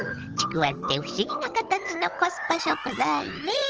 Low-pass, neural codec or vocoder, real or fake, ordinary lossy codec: 7.2 kHz; codec, 16 kHz, 16 kbps, FunCodec, trained on Chinese and English, 50 frames a second; fake; Opus, 16 kbps